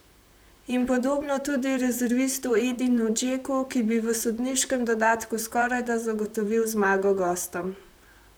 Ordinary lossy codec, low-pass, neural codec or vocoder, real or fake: none; none; vocoder, 44.1 kHz, 128 mel bands, Pupu-Vocoder; fake